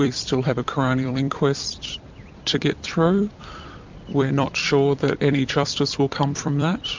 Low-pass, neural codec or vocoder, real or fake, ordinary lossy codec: 7.2 kHz; vocoder, 44.1 kHz, 128 mel bands every 256 samples, BigVGAN v2; fake; MP3, 64 kbps